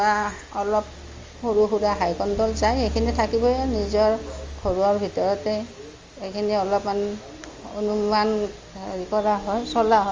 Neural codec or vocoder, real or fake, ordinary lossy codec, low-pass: none; real; Opus, 32 kbps; 7.2 kHz